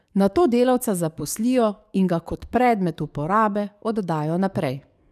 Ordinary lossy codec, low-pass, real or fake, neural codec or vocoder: none; 14.4 kHz; fake; codec, 44.1 kHz, 7.8 kbps, DAC